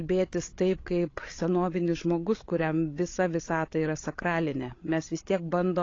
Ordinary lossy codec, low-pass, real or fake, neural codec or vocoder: AAC, 32 kbps; 7.2 kHz; fake; codec, 16 kHz, 16 kbps, FreqCodec, larger model